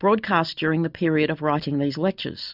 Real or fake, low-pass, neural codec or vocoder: real; 5.4 kHz; none